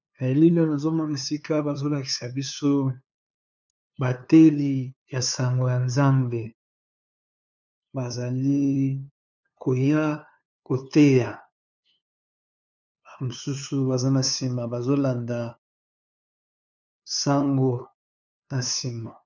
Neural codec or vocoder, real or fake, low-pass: codec, 16 kHz, 2 kbps, FunCodec, trained on LibriTTS, 25 frames a second; fake; 7.2 kHz